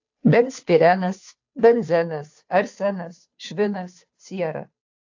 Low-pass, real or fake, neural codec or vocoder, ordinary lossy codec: 7.2 kHz; fake; codec, 16 kHz, 2 kbps, FunCodec, trained on Chinese and English, 25 frames a second; AAC, 48 kbps